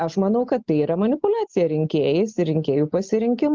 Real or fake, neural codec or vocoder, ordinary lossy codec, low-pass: real; none; Opus, 24 kbps; 7.2 kHz